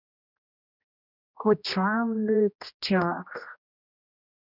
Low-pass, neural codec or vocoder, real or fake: 5.4 kHz; codec, 16 kHz, 1 kbps, X-Codec, HuBERT features, trained on general audio; fake